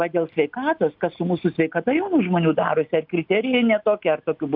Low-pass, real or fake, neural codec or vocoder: 5.4 kHz; real; none